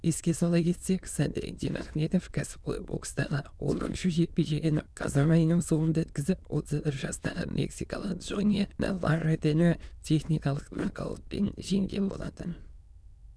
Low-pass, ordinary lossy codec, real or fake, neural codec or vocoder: none; none; fake; autoencoder, 22.05 kHz, a latent of 192 numbers a frame, VITS, trained on many speakers